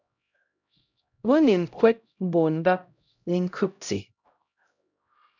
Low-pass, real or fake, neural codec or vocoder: 7.2 kHz; fake; codec, 16 kHz, 0.5 kbps, X-Codec, HuBERT features, trained on LibriSpeech